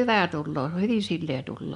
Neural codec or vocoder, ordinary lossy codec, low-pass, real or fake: none; none; 10.8 kHz; real